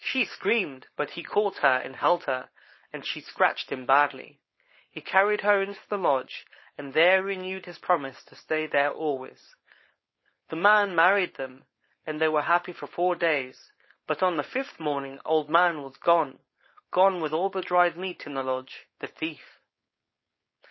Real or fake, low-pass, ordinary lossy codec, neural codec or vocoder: fake; 7.2 kHz; MP3, 24 kbps; codec, 16 kHz, 4.8 kbps, FACodec